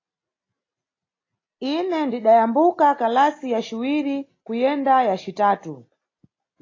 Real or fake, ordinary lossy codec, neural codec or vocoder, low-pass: real; AAC, 32 kbps; none; 7.2 kHz